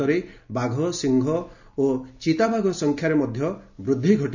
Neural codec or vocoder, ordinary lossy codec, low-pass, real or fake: none; none; 7.2 kHz; real